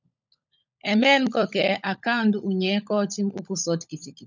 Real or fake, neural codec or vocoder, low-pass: fake; codec, 16 kHz, 16 kbps, FunCodec, trained on LibriTTS, 50 frames a second; 7.2 kHz